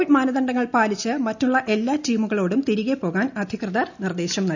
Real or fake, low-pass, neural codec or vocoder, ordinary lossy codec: real; 7.2 kHz; none; none